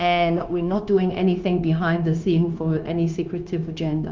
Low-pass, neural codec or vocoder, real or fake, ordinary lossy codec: 7.2 kHz; codec, 16 kHz, 0.9 kbps, LongCat-Audio-Codec; fake; Opus, 24 kbps